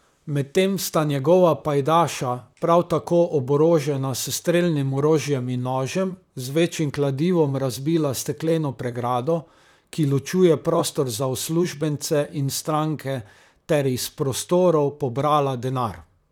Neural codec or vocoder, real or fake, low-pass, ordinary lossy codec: vocoder, 44.1 kHz, 128 mel bands, Pupu-Vocoder; fake; 19.8 kHz; none